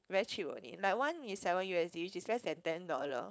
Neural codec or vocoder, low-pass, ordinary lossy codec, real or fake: codec, 16 kHz, 4.8 kbps, FACodec; none; none; fake